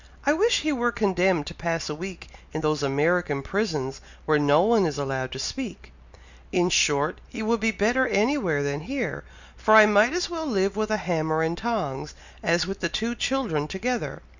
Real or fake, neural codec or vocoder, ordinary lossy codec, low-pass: real; none; Opus, 64 kbps; 7.2 kHz